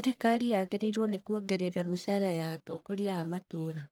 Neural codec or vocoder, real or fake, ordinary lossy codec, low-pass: codec, 44.1 kHz, 1.7 kbps, Pupu-Codec; fake; none; none